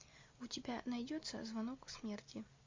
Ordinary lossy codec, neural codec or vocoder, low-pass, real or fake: MP3, 64 kbps; none; 7.2 kHz; real